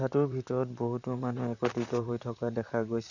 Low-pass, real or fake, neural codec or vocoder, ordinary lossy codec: 7.2 kHz; fake; vocoder, 44.1 kHz, 128 mel bands, Pupu-Vocoder; none